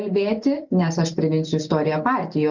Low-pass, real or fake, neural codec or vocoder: 7.2 kHz; real; none